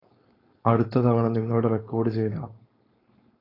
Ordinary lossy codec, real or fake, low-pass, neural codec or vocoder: AAC, 24 kbps; fake; 5.4 kHz; codec, 16 kHz, 4.8 kbps, FACodec